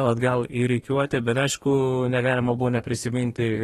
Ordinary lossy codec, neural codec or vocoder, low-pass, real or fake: AAC, 32 kbps; codec, 32 kHz, 1.9 kbps, SNAC; 14.4 kHz; fake